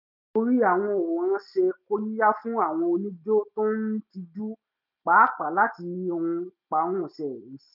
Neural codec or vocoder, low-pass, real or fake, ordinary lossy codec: none; 5.4 kHz; real; none